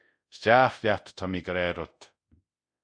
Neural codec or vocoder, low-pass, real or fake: codec, 24 kHz, 0.5 kbps, DualCodec; 9.9 kHz; fake